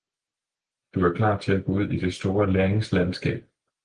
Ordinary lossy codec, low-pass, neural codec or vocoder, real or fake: Opus, 24 kbps; 9.9 kHz; none; real